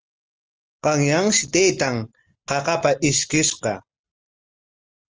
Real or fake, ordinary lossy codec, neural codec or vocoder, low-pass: real; Opus, 24 kbps; none; 7.2 kHz